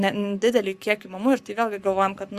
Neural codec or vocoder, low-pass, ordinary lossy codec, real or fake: codec, 44.1 kHz, 7.8 kbps, Pupu-Codec; 14.4 kHz; Opus, 64 kbps; fake